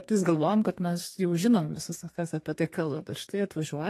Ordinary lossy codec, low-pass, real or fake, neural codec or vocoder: AAC, 48 kbps; 14.4 kHz; fake; codec, 32 kHz, 1.9 kbps, SNAC